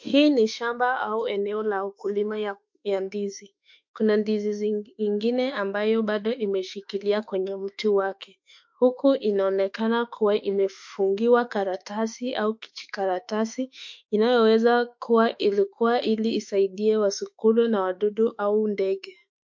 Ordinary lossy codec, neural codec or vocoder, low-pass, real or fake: MP3, 48 kbps; autoencoder, 48 kHz, 32 numbers a frame, DAC-VAE, trained on Japanese speech; 7.2 kHz; fake